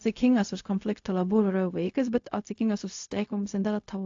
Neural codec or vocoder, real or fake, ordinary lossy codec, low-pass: codec, 16 kHz, 0.4 kbps, LongCat-Audio-Codec; fake; AAC, 48 kbps; 7.2 kHz